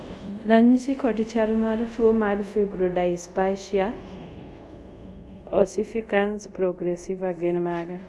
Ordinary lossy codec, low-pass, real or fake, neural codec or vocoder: none; none; fake; codec, 24 kHz, 0.5 kbps, DualCodec